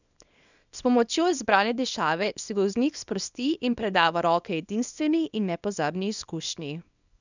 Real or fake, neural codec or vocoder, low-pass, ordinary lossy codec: fake; codec, 24 kHz, 0.9 kbps, WavTokenizer, small release; 7.2 kHz; none